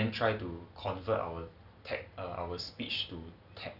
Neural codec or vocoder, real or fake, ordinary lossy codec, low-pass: none; real; none; 5.4 kHz